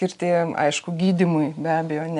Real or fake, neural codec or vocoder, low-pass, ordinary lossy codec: real; none; 10.8 kHz; AAC, 96 kbps